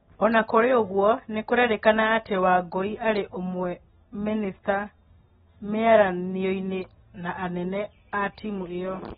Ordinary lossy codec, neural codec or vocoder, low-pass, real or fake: AAC, 16 kbps; none; 19.8 kHz; real